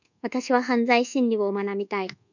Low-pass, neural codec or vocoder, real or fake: 7.2 kHz; codec, 24 kHz, 1.2 kbps, DualCodec; fake